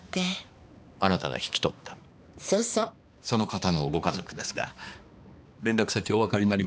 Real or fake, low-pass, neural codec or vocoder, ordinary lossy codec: fake; none; codec, 16 kHz, 2 kbps, X-Codec, HuBERT features, trained on balanced general audio; none